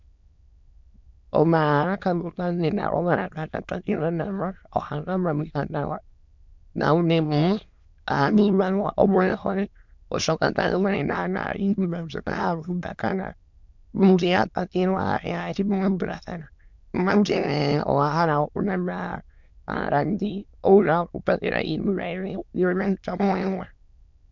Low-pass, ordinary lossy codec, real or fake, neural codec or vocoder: 7.2 kHz; MP3, 64 kbps; fake; autoencoder, 22.05 kHz, a latent of 192 numbers a frame, VITS, trained on many speakers